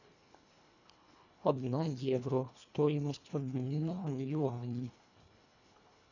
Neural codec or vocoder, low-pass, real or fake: codec, 24 kHz, 1.5 kbps, HILCodec; 7.2 kHz; fake